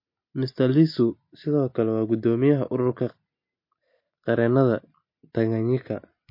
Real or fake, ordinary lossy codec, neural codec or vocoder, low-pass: real; MP3, 32 kbps; none; 5.4 kHz